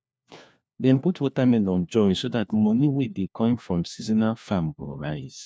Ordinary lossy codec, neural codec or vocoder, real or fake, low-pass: none; codec, 16 kHz, 1 kbps, FunCodec, trained on LibriTTS, 50 frames a second; fake; none